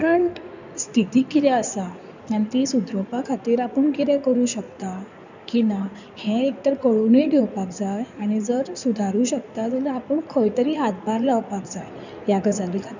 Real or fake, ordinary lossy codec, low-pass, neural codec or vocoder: fake; none; 7.2 kHz; codec, 16 kHz in and 24 kHz out, 2.2 kbps, FireRedTTS-2 codec